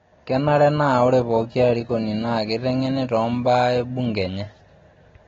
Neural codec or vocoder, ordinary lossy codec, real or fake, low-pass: none; AAC, 24 kbps; real; 7.2 kHz